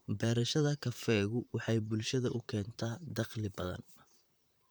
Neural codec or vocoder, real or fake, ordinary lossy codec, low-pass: vocoder, 44.1 kHz, 128 mel bands, Pupu-Vocoder; fake; none; none